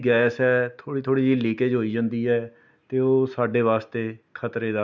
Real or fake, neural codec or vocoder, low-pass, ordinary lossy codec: real; none; 7.2 kHz; none